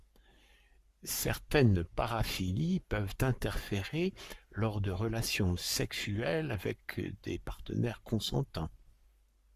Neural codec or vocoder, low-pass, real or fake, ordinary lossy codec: codec, 44.1 kHz, 7.8 kbps, Pupu-Codec; 14.4 kHz; fake; Opus, 64 kbps